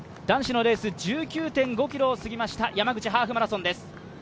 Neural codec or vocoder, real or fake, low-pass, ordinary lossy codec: none; real; none; none